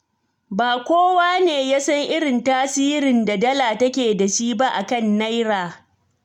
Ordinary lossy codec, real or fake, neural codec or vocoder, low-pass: none; real; none; 19.8 kHz